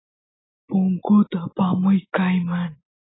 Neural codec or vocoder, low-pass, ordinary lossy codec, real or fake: none; 7.2 kHz; AAC, 16 kbps; real